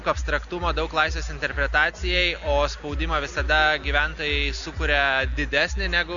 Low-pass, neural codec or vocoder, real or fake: 7.2 kHz; none; real